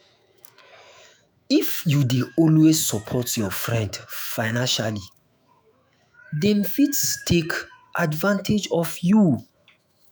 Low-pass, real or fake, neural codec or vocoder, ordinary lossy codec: none; fake; autoencoder, 48 kHz, 128 numbers a frame, DAC-VAE, trained on Japanese speech; none